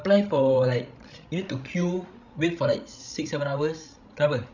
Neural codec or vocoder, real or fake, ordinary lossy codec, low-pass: codec, 16 kHz, 16 kbps, FreqCodec, larger model; fake; none; 7.2 kHz